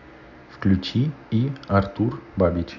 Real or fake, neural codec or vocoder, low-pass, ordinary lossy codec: real; none; 7.2 kHz; none